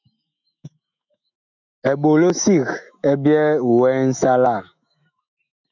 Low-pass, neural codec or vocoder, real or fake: 7.2 kHz; autoencoder, 48 kHz, 128 numbers a frame, DAC-VAE, trained on Japanese speech; fake